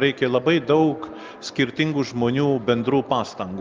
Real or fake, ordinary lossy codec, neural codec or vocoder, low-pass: real; Opus, 32 kbps; none; 7.2 kHz